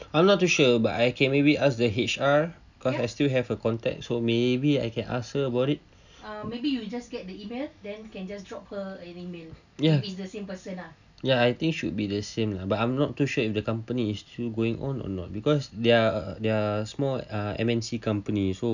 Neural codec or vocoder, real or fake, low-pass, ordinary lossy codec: none; real; 7.2 kHz; none